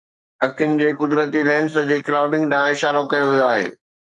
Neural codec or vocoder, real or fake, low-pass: codec, 44.1 kHz, 2.6 kbps, SNAC; fake; 10.8 kHz